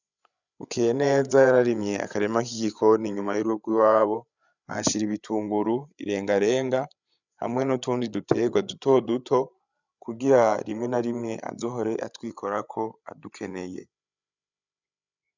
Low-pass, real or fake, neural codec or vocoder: 7.2 kHz; fake; codec, 16 kHz, 8 kbps, FreqCodec, larger model